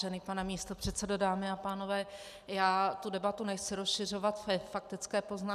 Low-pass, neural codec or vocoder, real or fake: 14.4 kHz; none; real